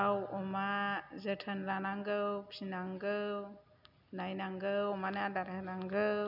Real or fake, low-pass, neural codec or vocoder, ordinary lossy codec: real; 5.4 kHz; none; none